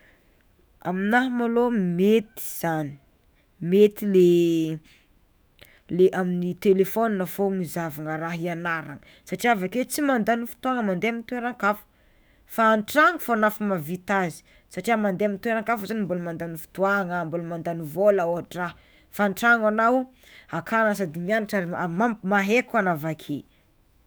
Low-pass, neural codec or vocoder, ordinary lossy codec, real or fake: none; autoencoder, 48 kHz, 128 numbers a frame, DAC-VAE, trained on Japanese speech; none; fake